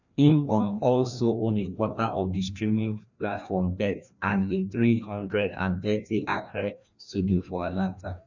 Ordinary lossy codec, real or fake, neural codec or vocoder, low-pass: none; fake; codec, 16 kHz, 1 kbps, FreqCodec, larger model; 7.2 kHz